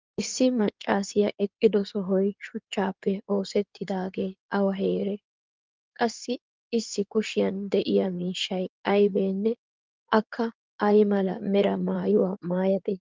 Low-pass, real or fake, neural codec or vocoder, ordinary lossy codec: 7.2 kHz; fake; codec, 16 kHz in and 24 kHz out, 2.2 kbps, FireRedTTS-2 codec; Opus, 24 kbps